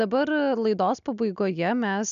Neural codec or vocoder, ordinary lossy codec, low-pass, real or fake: none; MP3, 96 kbps; 7.2 kHz; real